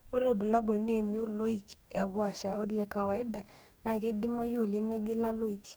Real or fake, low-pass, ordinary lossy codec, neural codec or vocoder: fake; none; none; codec, 44.1 kHz, 2.6 kbps, DAC